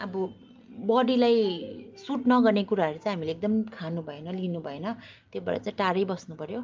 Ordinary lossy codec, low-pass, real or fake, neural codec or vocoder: Opus, 32 kbps; 7.2 kHz; real; none